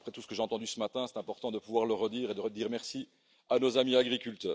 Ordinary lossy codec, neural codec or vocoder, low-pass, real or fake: none; none; none; real